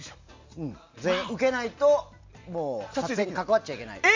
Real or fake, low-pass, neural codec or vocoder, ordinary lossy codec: real; 7.2 kHz; none; MP3, 64 kbps